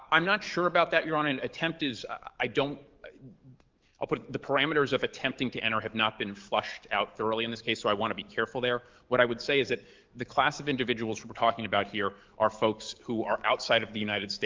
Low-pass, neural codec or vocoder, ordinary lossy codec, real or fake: 7.2 kHz; codec, 44.1 kHz, 7.8 kbps, DAC; Opus, 32 kbps; fake